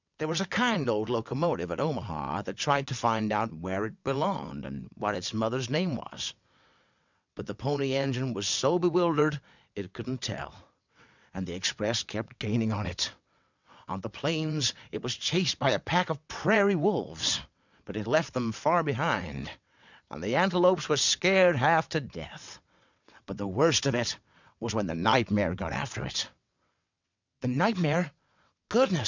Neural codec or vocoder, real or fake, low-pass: vocoder, 22.05 kHz, 80 mel bands, WaveNeXt; fake; 7.2 kHz